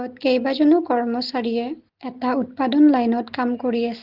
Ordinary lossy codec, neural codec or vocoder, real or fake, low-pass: Opus, 16 kbps; none; real; 5.4 kHz